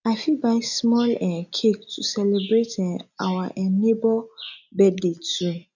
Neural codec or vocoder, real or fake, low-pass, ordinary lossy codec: none; real; 7.2 kHz; none